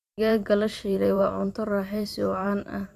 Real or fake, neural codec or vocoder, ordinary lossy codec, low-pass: fake; vocoder, 44.1 kHz, 128 mel bands every 512 samples, BigVGAN v2; Opus, 64 kbps; 14.4 kHz